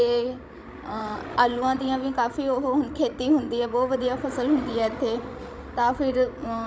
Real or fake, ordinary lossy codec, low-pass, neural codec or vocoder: fake; none; none; codec, 16 kHz, 16 kbps, FreqCodec, larger model